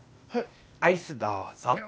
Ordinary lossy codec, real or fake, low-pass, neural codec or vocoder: none; fake; none; codec, 16 kHz, 0.8 kbps, ZipCodec